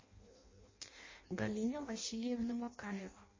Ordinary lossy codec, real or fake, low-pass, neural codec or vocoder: MP3, 32 kbps; fake; 7.2 kHz; codec, 16 kHz in and 24 kHz out, 0.6 kbps, FireRedTTS-2 codec